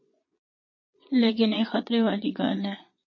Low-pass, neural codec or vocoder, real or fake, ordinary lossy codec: 7.2 kHz; vocoder, 22.05 kHz, 80 mel bands, Vocos; fake; MP3, 32 kbps